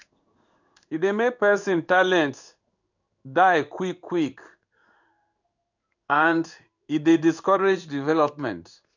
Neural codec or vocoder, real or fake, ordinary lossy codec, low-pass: codec, 16 kHz in and 24 kHz out, 1 kbps, XY-Tokenizer; fake; none; 7.2 kHz